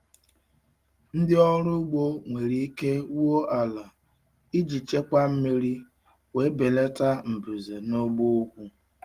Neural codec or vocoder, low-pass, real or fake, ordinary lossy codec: none; 14.4 kHz; real; Opus, 24 kbps